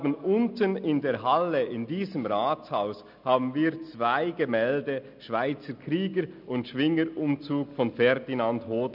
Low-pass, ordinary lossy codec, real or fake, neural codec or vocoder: 5.4 kHz; none; real; none